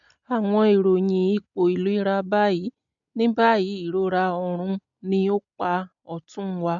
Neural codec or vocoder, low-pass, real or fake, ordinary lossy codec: none; 7.2 kHz; real; MP3, 64 kbps